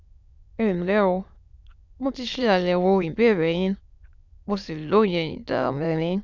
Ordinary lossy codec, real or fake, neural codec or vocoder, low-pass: none; fake; autoencoder, 22.05 kHz, a latent of 192 numbers a frame, VITS, trained on many speakers; 7.2 kHz